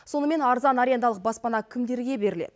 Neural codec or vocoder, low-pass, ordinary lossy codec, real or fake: none; none; none; real